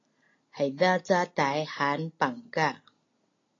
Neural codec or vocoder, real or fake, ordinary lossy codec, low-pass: none; real; AAC, 32 kbps; 7.2 kHz